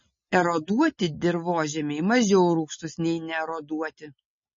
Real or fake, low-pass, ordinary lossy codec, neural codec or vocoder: real; 7.2 kHz; MP3, 32 kbps; none